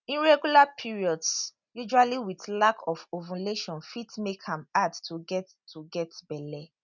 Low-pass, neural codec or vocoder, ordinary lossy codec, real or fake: 7.2 kHz; none; none; real